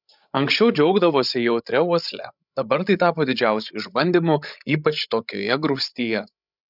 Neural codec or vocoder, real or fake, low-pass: codec, 16 kHz, 16 kbps, FreqCodec, larger model; fake; 5.4 kHz